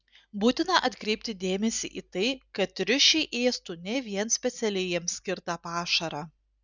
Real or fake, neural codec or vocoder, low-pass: real; none; 7.2 kHz